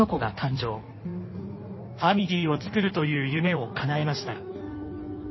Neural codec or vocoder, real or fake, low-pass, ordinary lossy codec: codec, 16 kHz in and 24 kHz out, 1.1 kbps, FireRedTTS-2 codec; fake; 7.2 kHz; MP3, 24 kbps